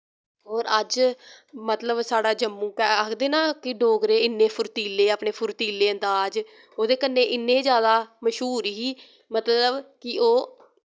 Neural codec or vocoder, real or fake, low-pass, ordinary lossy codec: none; real; none; none